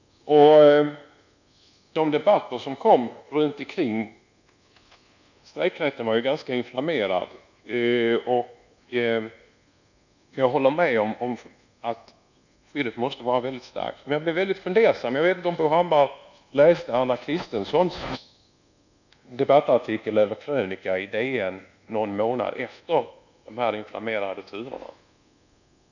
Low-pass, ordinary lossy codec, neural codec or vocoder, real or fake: 7.2 kHz; none; codec, 24 kHz, 1.2 kbps, DualCodec; fake